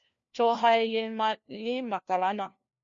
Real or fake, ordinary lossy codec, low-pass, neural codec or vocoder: fake; MP3, 48 kbps; 7.2 kHz; codec, 16 kHz, 1 kbps, FunCodec, trained on LibriTTS, 50 frames a second